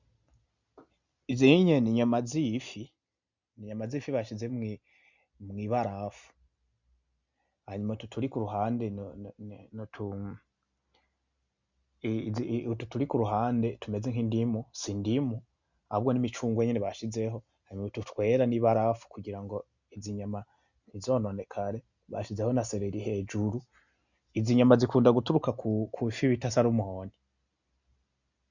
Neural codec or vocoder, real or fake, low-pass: none; real; 7.2 kHz